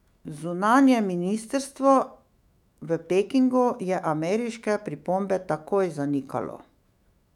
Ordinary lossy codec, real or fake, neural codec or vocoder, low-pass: none; fake; autoencoder, 48 kHz, 128 numbers a frame, DAC-VAE, trained on Japanese speech; 19.8 kHz